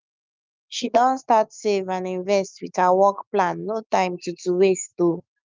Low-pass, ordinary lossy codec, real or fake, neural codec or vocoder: 7.2 kHz; Opus, 24 kbps; fake; autoencoder, 48 kHz, 128 numbers a frame, DAC-VAE, trained on Japanese speech